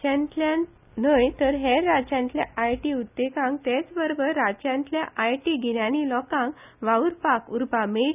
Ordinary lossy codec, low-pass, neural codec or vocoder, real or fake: none; 3.6 kHz; none; real